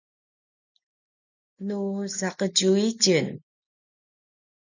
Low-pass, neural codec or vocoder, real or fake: 7.2 kHz; none; real